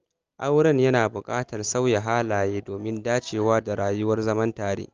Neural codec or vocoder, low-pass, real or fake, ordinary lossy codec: none; 7.2 kHz; real; Opus, 24 kbps